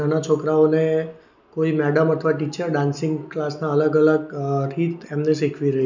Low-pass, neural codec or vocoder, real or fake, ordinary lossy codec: 7.2 kHz; none; real; none